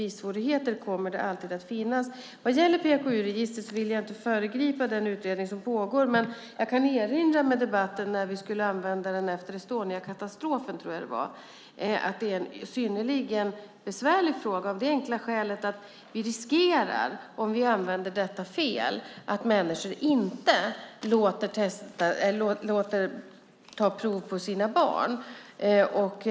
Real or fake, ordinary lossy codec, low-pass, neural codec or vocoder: real; none; none; none